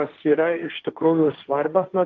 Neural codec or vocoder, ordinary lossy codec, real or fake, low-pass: codec, 16 kHz, 1.1 kbps, Voila-Tokenizer; Opus, 32 kbps; fake; 7.2 kHz